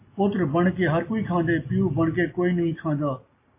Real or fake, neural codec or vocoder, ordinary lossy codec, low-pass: real; none; MP3, 24 kbps; 3.6 kHz